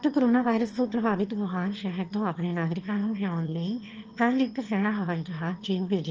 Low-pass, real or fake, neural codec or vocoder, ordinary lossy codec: 7.2 kHz; fake; autoencoder, 22.05 kHz, a latent of 192 numbers a frame, VITS, trained on one speaker; Opus, 24 kbps